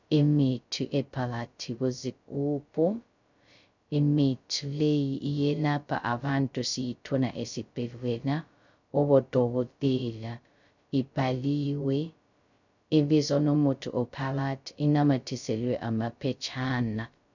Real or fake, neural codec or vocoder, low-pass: fake; codec, 16 kHz, 0.2 kbps, FocalCodec; 7.2 kHz